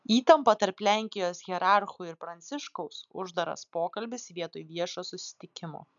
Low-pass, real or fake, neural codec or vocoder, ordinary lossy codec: 7.2 kHz; real; none; MP3, 96 kbps